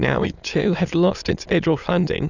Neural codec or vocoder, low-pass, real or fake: autoencoder, 22.05 kHz, a latent of 192 numbers a frame, VITS, trained on many speakers; 7.2 kHz; fake